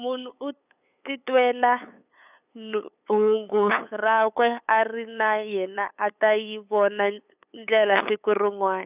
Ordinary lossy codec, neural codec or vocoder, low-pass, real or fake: none; codec, 16 kHz, 8 kbps, FunCodec, trained on LibriTTS, 25 frames a second; 3.6 kHz; fake